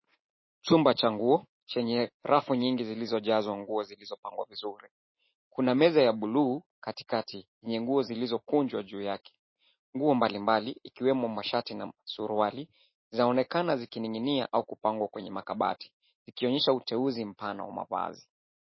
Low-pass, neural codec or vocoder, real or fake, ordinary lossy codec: 7.2 kHz; none; real; MP3, 24 kbps